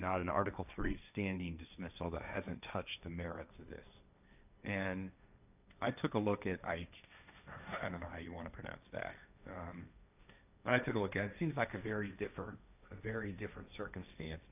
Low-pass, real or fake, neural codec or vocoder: 3.6 kHz; fake; codec, 16 kHz, 1.1 kbps, Voila-Tokenizer